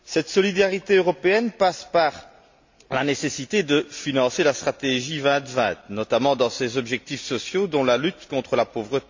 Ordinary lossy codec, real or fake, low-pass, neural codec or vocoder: MP3, 48 kbps; real; 7.2 kHz; none